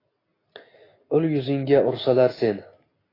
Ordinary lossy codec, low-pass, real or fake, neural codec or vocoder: AAC, 24 kbps; 5.4 kHz; real; none